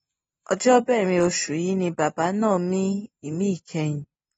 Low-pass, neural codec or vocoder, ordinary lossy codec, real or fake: 19.8 kHz; none; AAC, 24 kbps; real